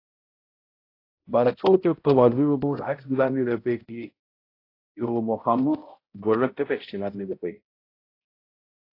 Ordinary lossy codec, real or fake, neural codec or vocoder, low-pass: AAC, 32 kbps; fake; codec, 16 kHz, 0.5 kbps, X-Codec, HuBERT features, trained on balanced general audio; 5.4 kHz